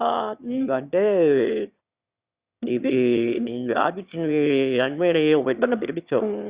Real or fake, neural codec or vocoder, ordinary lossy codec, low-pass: fake; autoencoder, 22.05 kHz, a latent of 192 numbers a frame, VITS, trained on one speaker; Opus, 64 kbps; 3.6 kHz